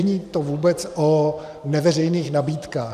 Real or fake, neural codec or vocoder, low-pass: fake; vocoder, 44.1 kHz, 128 mel bands every 512 samples, BigVGAN v2; 14.4 kHz